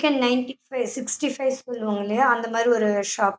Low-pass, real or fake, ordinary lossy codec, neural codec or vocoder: none; real; none; none